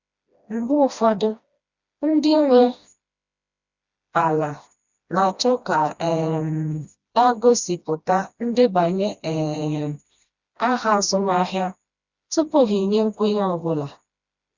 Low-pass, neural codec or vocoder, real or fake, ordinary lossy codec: 7.2 kHz; codec, 16 kHz, 1 kbps, FreqCodec, smaller model; fake; Opus, 64 kbps